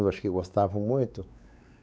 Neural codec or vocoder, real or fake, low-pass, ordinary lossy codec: codec, 16 kHz, 4 kbps, X-Codec, WavLM features, trained on Multilingual LibriSpeech; fake; none; none